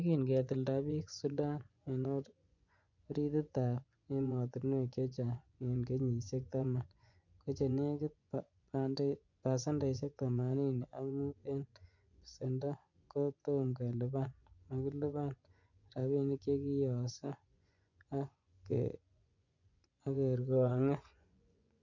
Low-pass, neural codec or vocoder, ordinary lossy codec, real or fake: 7.2 kHz; vocoder, 24 kHz, 100 mel bands, Vocos; none; fake